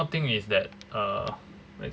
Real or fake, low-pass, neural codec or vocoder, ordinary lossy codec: real; none; none; none